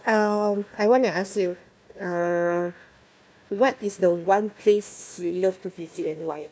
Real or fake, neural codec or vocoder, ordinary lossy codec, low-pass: fake; codec, 16 kHz, 1 kbps, FunCodec, trained on Chinese and English, 50 frames a second; none; none